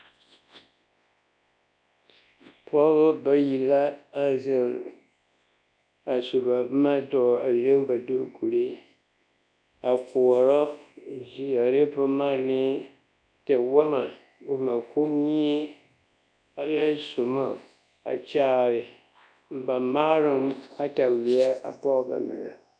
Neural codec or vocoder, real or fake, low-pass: codec, 24 kHz, 0.9 kbps, WavTokenizer, large speech release; fake; 9.9 kHz